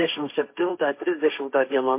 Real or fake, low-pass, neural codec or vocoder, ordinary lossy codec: fake; 3.6 kHz; codec, 16 kHz, 1.1 kbps, Voila-Tokenizer; MP3, 24 kbps